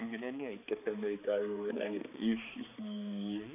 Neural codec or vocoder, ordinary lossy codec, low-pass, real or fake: codec, 16 kHz, 4 kbps, X-Codec, HuBERT features, trained on balanced general audio; none; 3.6 kHz; fake